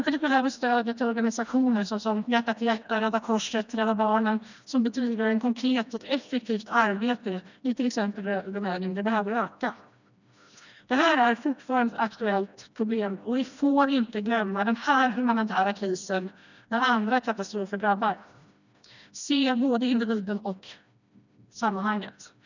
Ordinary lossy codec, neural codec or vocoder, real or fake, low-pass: none; codec, 16 kHz, 1 kbps, FreqCodec, smaller model; fake; 7.2 kHz